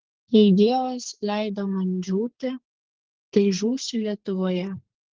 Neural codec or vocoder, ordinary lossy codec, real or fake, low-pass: codec, 32 kHz, 1.9 kbps, SNAC; Opus, 16 kbps; fake; 7.2 kHz